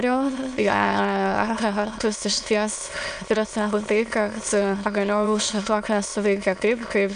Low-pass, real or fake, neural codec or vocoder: 9.9 kHz; fake; autoencoder, 22.05 kHz, a latent of 192 numbers a frame, VITS, trained on many speakers